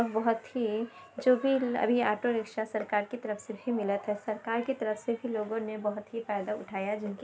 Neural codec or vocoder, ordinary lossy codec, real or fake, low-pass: none; none; real; none